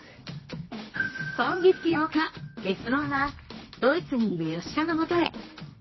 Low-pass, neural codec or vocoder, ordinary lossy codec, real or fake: 7.2 kHz; codec, 24 kHz, 0.9 kbps, WavTokenizer, medium music audio release; MP3, 24 kbps; fake